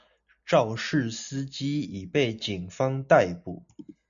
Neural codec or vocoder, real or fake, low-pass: none; real; 7.2 kHz